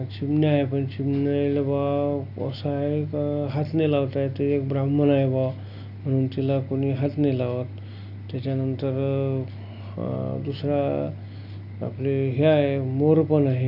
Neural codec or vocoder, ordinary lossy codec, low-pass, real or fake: none; none; 5.4 kHz; real